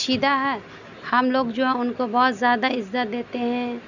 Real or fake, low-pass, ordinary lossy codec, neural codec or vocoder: real; 7.2 kHz; none; none